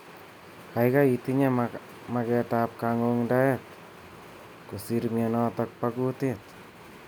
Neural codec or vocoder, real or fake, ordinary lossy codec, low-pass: none; real; none; none